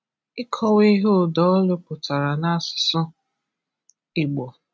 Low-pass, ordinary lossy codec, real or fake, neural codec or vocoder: none; none; real; none